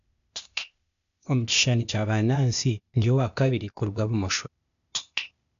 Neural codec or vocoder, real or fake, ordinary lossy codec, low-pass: codec, 16 kHz, 0.8 kbps, ZipCodec; fake; none; 7.2 kHz